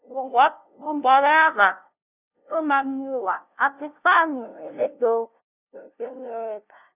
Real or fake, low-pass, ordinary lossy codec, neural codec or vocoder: fake; 3.6 kHz; none; codec, 16 kHz, 0.5 kbps, FunCodec, trained on LibriTTS, 25 frames a second